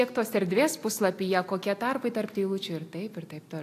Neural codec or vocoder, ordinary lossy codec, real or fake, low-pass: none; AAC, 64 kbps; real; 14.4 kHz